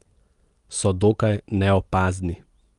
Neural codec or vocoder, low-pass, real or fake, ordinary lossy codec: none; 10.8 kHz; real; Opus, 32 kbps